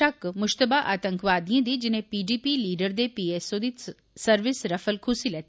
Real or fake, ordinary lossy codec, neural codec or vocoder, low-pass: real; none; none; none